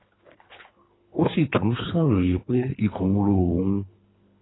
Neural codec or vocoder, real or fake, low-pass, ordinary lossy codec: codec, 24 kHz, 3 kbps, HILCodec; fake; 7.2 kHz; AAC, 16 kbps